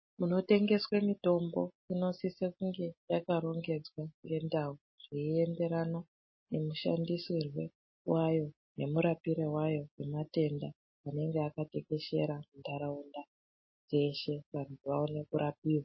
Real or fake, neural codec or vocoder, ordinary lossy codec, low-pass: real; none; MP3, 24 kbps; 7.2 kHz